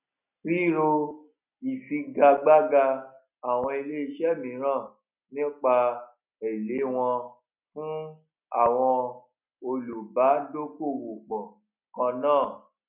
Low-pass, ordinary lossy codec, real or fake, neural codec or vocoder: 3.6 kHz; none; real; none